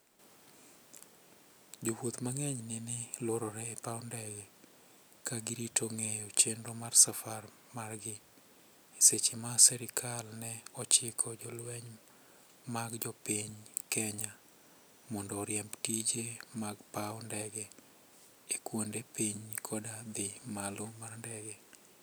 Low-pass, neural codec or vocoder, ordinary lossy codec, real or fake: none; none; none; real